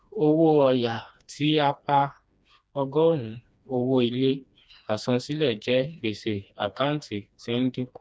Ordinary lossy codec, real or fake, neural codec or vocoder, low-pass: none; fake; codec, 16 kHz, 2 kbps, FreqCodec, smaller model; none